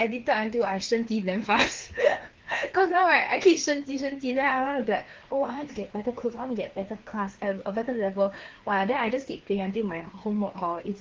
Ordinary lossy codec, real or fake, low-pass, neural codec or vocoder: Opus, 16 kbps; fake; 7.2 kHz; codec, 16 kHz, 2 kbps, FreqCodec, larger model